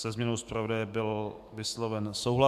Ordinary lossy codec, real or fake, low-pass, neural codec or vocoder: MP3, 96 kbps; fake; 14.4 kHz; autoencoder, 48 kHz, 128 numbers a frame, DAC-VAE, trained on Japanese speech